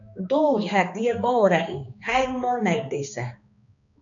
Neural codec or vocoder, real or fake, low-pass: codec, 16 kHz, 2 kbps, X-Codec, HuBERT features, trained on balanced general audio; fake; 7.2 kHz